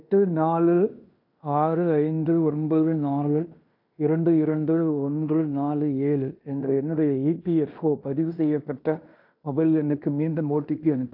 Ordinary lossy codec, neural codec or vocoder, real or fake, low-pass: AAC, 48 kbps; codec, 16 kHz in and 24 kHz out, 0.9 kbps, LongCat-Audio-Codec, fine tuned four codebook decoder; fake; 5.4 kHz